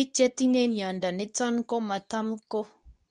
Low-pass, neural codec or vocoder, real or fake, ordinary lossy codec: 10.8 kHz; codec, 24 kHz, 0.9 kbps, WavTokenizer, medium speech release version 2; fake; none